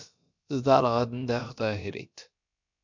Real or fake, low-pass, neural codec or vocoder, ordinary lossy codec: fake; 7.2 kHz; codec, 16 kHz, about 1 kbps, DyCAST, with the encoder's durations; MP3, 64 kbps